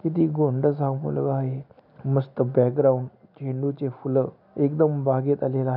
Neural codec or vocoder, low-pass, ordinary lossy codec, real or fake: none; 5.4 kHz; none; real